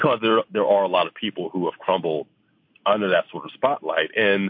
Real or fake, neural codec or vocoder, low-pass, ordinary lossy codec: real; none; 5.4 kHz; MP3, 32 kbps